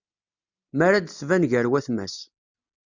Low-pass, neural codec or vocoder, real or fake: 7.2 kHz; none; real